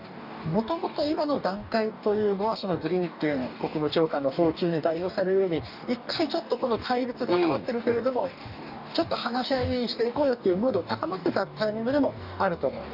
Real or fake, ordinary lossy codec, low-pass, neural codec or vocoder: fake; none; 5.4 kHz; codec, 44.1 kHz, 2.6 kbps, DAC